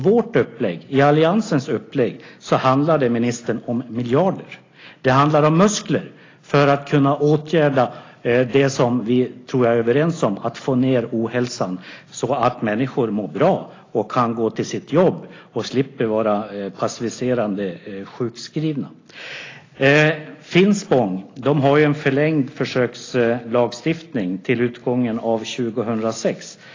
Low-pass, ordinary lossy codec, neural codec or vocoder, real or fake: 7.2 kHz; AAC, 32 kbps; none; real